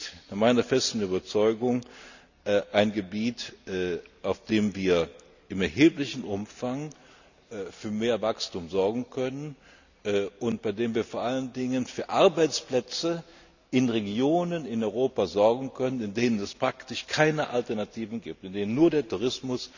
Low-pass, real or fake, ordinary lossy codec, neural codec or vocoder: 7.2 kHz; real; none; none